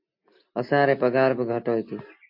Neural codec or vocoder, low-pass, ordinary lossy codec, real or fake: none; 5.4 kHz; MP3, 32 kbps; real